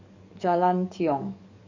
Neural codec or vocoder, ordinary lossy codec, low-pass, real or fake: codec, 44.1 kHz, 7.8 kbps, DAC; none; 7.2 kHz; fake